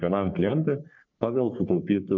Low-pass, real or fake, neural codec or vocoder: 7.2 kHz; fake; codec, 44.1 kHz, 3.4 kbps, Pupu-Codec